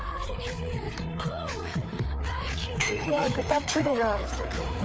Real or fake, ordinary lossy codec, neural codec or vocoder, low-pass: fake; none; codec, 16 kHz, 4 kbps, FreqCodec, larger model; none